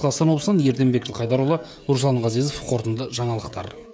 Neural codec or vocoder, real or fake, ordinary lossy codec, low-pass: codec, 16 kHz, 16 kbps, FreqCodec, smaller model; fake; none; none